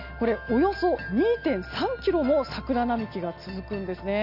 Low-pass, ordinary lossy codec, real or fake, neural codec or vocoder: 5.4 kHz; none; real; none